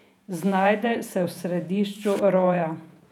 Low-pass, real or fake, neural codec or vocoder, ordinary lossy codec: 19.8 kHz; fake; vocoder, 48 kHz, 128 mel bands, Vocos; none